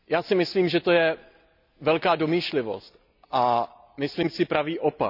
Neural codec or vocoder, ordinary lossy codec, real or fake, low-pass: none; none; real; 5.4 kHz